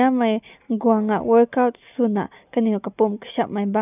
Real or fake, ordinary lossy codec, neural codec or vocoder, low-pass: real; none; none; 3.6 kHz